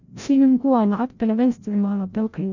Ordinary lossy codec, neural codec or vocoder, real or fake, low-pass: none; codec, 16 kHz, 0.5 kbps, FreqCodec, larger model; fake; 7.2 kHz